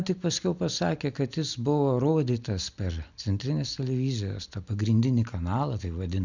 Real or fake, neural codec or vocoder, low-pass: real; none; 7.2 kHz